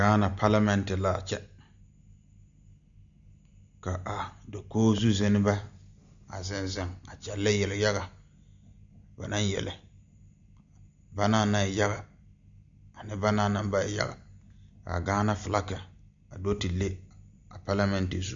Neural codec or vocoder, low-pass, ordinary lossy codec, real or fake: none; 7.2 kHz; Opus, 64 kbps; real